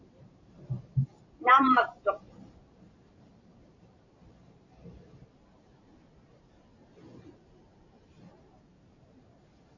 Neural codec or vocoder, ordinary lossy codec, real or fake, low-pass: vocoder, 44.1 kHz, 128 mel bands every 512 samples, BigVGAN v2; AAC, 48 kbps; fake; 7.2 kHz